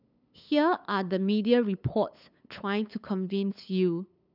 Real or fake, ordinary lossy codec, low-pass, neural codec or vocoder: fake; none; 5.4 kHz; codec, 16 kHz, 8 kbps, FunCodec, trained on LibriTTS, 25 frames a second